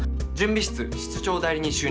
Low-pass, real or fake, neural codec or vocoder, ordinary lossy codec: none; real; none; none